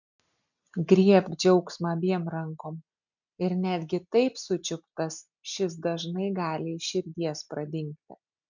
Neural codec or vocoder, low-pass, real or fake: none; 7.2 kHz; real